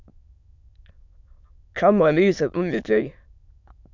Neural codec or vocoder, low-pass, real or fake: autoencoder, 22.05 kHz, a latent of 192 numbers a frame, VITS, trained on many speakers; 7.2 kHz; fake